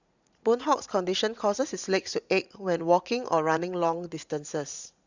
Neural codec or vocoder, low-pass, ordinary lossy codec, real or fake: vocoder, 44.1 kHz, 80 mel bands, Vocos; 7.2 kHz; Opus, 64 kbps; fake